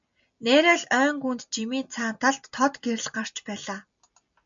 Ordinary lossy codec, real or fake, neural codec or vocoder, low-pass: MP3, 64 kbps; real; none; 7.2 kHz